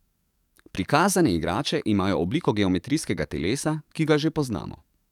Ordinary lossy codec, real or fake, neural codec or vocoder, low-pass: none; fake; codec, 44.1 kHz, 7.8 kbps, DAC; 19.8 kHz